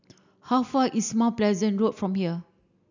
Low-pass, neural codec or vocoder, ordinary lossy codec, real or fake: 7.2 kHz; none; none; real